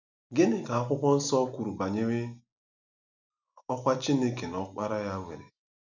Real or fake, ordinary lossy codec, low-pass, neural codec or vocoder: real; none; 7.2 kHz; none